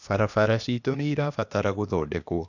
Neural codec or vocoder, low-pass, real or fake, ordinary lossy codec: codec, 16 kHz, 0.8 kbps, ZipCodec; 7.2 kHz; fake; none